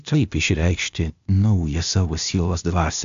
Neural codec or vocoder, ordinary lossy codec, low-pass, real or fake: codec, 16 kHz, 0.8 kbps, ZipCodec; MP3, 64 kbps; 7.2 kHz; fake